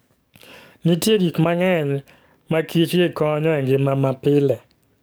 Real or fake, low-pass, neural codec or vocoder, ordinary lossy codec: fake; none; codec, 44.1 kHz, 7.8 kbps, DAC; none